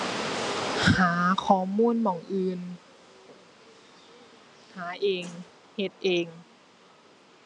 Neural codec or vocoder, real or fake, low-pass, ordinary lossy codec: none; real; 10.8 kHz; none